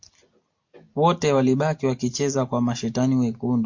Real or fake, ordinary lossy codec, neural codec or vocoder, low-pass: real; MP3, 48 kbps; none; 7.2 kHz